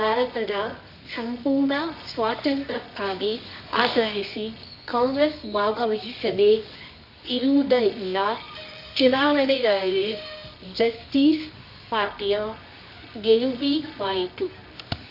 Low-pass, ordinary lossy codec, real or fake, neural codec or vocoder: 5.4 kHz; none; fake; codec, 24 kHz, 0.9 kbps, WavTokenizer, medium music audio release